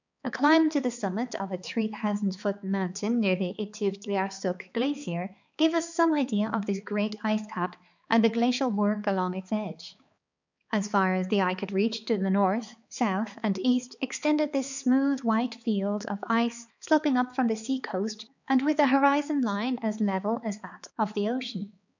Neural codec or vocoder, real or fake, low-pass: codec, 16 kHz, 4 kbps, X-Codec, HuBERT features, trained on balanced general audio; fake; 7.2 kHz